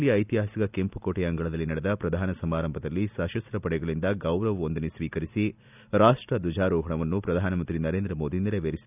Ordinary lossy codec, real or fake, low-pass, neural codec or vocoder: none; real; 3.6 kHz; none